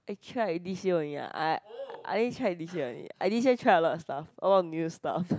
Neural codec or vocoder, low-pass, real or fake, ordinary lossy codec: none; none; real; none